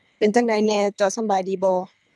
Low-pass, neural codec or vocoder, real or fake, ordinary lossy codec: none; codec, 24 kHz, 3 kbps, HILCodec; fake; none